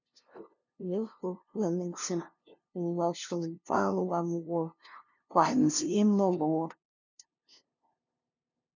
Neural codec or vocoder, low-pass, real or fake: codec, 16 kHz, 0.5 kbps, FunCodec, trained on LibriTTS, 25 frames a second; 7.2 kHz; fake